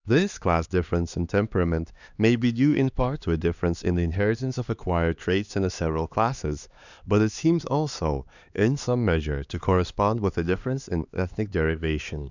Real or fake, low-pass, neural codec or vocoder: fake; 7.2 kHz; codec, 16 kHz, 2 kbps, X-Codec, HuBERT features, trained on LibriSpeech